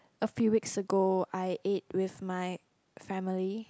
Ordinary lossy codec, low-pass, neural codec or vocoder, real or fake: none; none; none; real